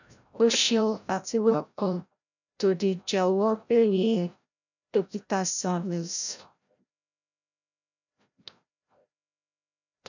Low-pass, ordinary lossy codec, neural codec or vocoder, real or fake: 7.2 kHz; none; codec, 16 kHz, 0.5 kbps, FreqCodec, larger model; fake